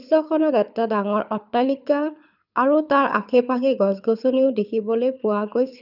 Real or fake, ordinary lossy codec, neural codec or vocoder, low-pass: fake; none; codec, 24 kHz, 6 kbps, HILCodec; 5.4 kHz